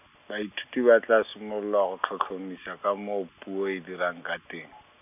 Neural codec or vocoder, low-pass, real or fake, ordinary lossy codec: none; 3.6 kHz; real; none